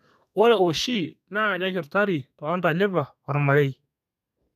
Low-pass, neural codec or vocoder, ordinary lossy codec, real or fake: 14.4 kHz; codec, 32 kHz, 1.9 kbps, SNAC; none; fake